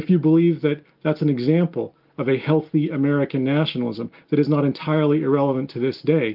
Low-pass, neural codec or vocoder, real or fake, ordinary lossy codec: 5.4 kHz; none; real; Opus, 32 kbps